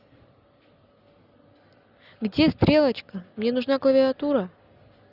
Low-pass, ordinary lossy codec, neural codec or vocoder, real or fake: 5.4 kHz; none; none; real